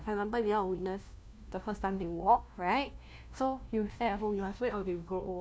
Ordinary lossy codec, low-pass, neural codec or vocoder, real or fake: none; none; codec, 16 kHz, 1 kbps, FunCodec, trained on LibriTTS, 50 frames a second; fake